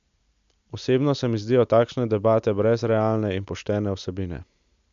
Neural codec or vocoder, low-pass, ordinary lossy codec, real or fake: none; 7.2 kHz; MP3, 64 kbps; real